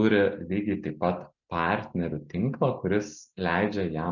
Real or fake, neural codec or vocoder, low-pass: real; none; 7.2 kHz